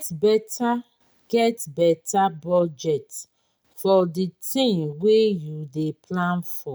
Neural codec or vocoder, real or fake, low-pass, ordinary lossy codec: none; real; none; none